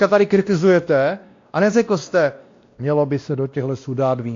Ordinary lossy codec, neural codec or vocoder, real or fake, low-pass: AAC, 48 kbps; codec, 16 kHz, 1 kbps, X-Codec, WavLM features, trained on Multilingual LibriSpeech; fake; 7.2 kHz